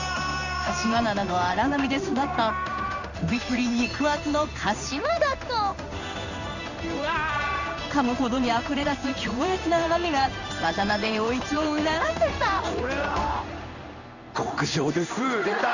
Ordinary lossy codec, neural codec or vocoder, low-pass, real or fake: none; codec, 16 kHz in and 24 kHz out, 1 kbps, XY-Tokenizer; 7.2 kHz; fake